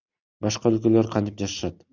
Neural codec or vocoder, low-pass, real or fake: none; 7.2 kHz; real